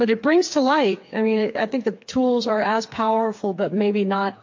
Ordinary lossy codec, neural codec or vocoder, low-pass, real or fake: MP3, 48 kbps; codec, 16 kHz, 4 kbps, FreqCodec, smaller model; 7.2 kHz; fake